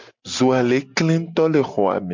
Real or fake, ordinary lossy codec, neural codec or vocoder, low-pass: real; MP3, 64 kbps; none; 7.2 kHz